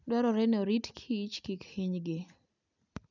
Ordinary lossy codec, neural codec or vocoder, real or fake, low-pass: none; none; real; 7.2 kHz